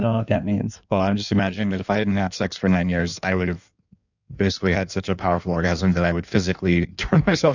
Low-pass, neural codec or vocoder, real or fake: 7.2 kHz; codec, 16 kHz in and 24 kHz out, 1.1 kbps, FireRedTTS-2 codec; fake